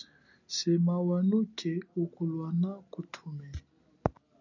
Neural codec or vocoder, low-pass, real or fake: none; 7.2 kHz; real